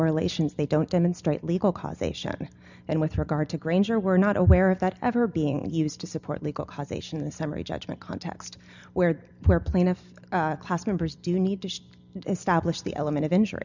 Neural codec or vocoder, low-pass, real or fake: none; 7.2 kHz; real